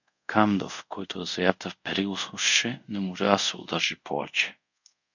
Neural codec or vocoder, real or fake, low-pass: codec, 24 kHz, 0.5 kbps, DualCodec; fake; 7.2 kHz